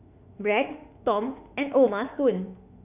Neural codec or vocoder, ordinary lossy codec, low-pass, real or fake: autoencoder, 48 kHz, 32 numbers a frame, DAC-VAE, trained on Japanese speech; none; 3.6 kHz; fake